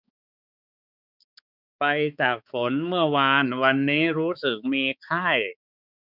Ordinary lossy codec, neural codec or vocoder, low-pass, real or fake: none; codec, 16 kHz, 4 kbps, X-Codec, HuBERT features, trained on balanced general audio; 5.4 kHz; fake